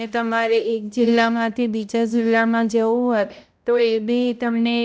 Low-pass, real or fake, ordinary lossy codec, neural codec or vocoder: none; fake; none; codec, 16 kHz, 0.5 kbps, X-Codec, HuBERT features, trained on balanced general audio